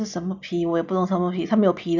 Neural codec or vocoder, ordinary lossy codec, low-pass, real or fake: none; none; 7.2 kHz; real